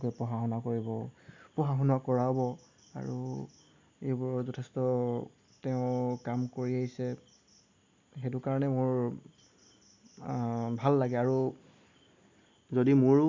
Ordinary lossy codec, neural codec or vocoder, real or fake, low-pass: MP3, 64 kbps; none; real; 7.2 kHz